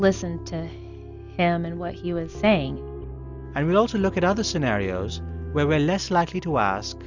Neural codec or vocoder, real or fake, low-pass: none; real; 7.2 kHz